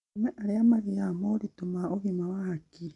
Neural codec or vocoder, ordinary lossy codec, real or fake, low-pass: none; none; real; 10.8 kHz